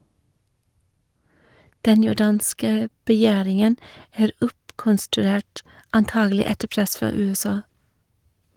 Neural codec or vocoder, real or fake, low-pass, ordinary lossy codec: codec, 44.1 kHz, 7.8 kbps, Pupu-Codec; fake; 19.8 kHz; Opus, 24 kbps